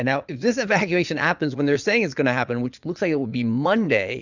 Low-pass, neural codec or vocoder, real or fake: 7.2 kHz; vocoder, 22.05 kHz, 80 mel bands, Vocos; fake